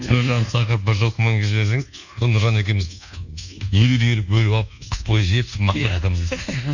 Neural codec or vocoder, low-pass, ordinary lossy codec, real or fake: codec, 24 kHz, 1.2 kbps, DualCodec; 7.2 kHz; none; fake